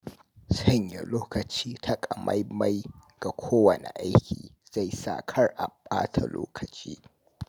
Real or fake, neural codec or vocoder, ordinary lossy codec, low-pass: real; none; none; none